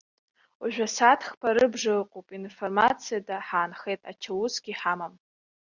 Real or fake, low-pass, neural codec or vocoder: real; 7.2 kHz; none